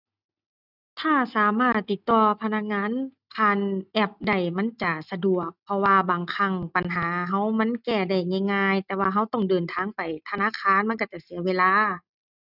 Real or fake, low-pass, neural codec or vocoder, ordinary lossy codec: real; 5.4 kHz; none; none